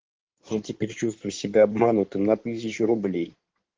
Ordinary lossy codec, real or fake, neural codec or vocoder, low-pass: Opus, 16 kbps; fake; codec, 16 kHz in and 24 kHz out, 2.2 kbps, FireRedTTS-2 codec; 7.2 kHz